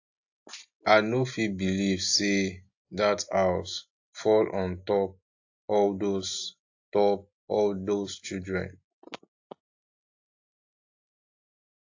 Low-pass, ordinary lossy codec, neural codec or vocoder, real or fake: 7.2 kHz; AAC, 48 kbps; none; real